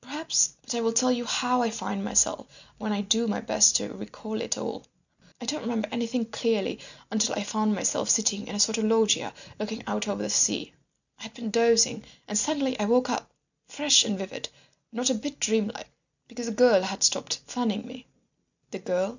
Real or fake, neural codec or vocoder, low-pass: real; none; 7.2 kHz